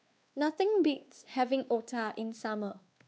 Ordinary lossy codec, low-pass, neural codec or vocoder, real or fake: none; none; codec, 16 kHz, 2 kbps, X-Codec, WavLM features, trained on Multilingual LibriSpeech; fake